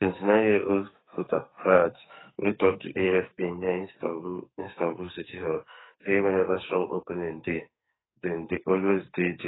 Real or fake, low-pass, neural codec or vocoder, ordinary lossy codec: fake; 7.2 kHz; codec, 44.1 kHz, 2.6 kbps, SNAC; AAC, 16 kbps